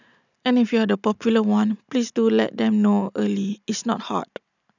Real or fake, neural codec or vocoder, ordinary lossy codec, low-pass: real; none; none; 7.2 kHz